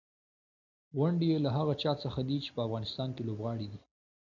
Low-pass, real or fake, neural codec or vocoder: 7.2 kHz; real; none